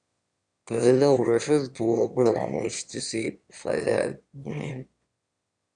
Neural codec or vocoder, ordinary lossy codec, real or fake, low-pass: autoencoder, 22.05 kHz, a latent of 192 numbers a frame, VITS, trained on one speaker; Opus, 64 kbps; fake; 9.9 kHz